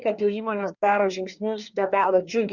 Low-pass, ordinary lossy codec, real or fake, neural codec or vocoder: 7.2 kHz; Opus, 64 kbps; fake; codec, 24 kHz, 1 kbps, SNAC